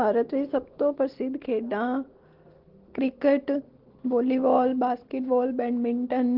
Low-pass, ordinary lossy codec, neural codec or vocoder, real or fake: 5.4 kHz; Opus, 16 kbps; none; real